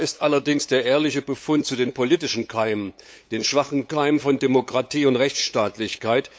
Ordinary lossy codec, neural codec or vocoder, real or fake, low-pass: none; codec, 16 kHz, 8 kbps, FunCodec, trained on LibriTTS, 25 frames a second; fake; none